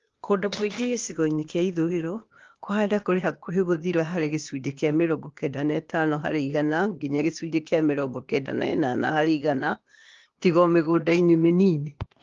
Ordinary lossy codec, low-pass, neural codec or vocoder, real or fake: Opus, 32 kbps; 7.2 kHz; codec, 16 kHz, 0.8 kbps, ZipCodec; fake